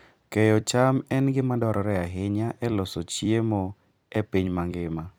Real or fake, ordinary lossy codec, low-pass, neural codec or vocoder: fake; none; none; vocoder, 44.1 kHz, 128 mel bands every 256 samples, BigVGAN v2